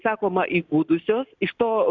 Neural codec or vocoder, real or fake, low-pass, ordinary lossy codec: none; real; 7.2 kHz; MP3, 64 kbps